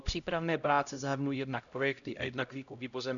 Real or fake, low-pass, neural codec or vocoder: fake; 7.2 kHz; codec, 16 kHz, 0.5 kbps, X-Codec, HuBERT features, trained on LibriSpeech